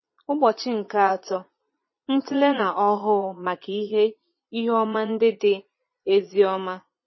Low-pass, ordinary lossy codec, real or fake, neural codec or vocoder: 7.2 kHz; MP3, 24 kbps; fake; vocoder, 22.05 kHz, 80 mel bands, Vocos